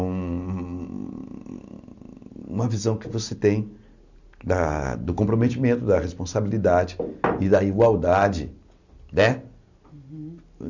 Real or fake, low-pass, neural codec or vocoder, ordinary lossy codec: real; 7.2 kHz; none; none